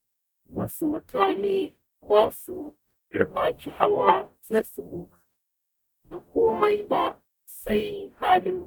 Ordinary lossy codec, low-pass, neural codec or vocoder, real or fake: none; 19.8 kHz; codec, 44.1 kHz, 0.9 kbps, DAC; fake